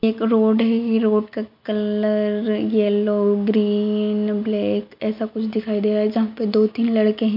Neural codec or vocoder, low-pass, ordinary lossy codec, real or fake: none; 5.4 kHz; MP3, 48 kbps; real